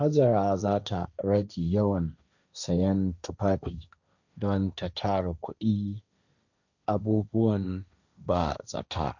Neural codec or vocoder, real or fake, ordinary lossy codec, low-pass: codec, 16 kHz, 1.1 kbps, Voila-Tokenizer; fake; none; 7.2 kHz